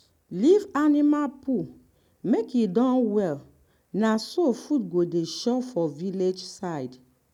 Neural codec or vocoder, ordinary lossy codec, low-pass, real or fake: none; none; 19.8 kHz; real